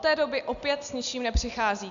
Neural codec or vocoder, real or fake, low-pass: none; real; 7.2 kHz